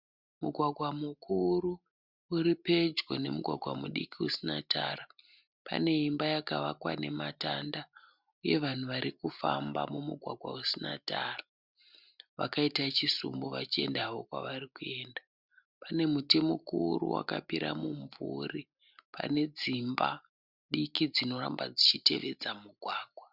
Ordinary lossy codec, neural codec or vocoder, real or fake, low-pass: Opus, 64 kbps; none; real; 5.4 kHz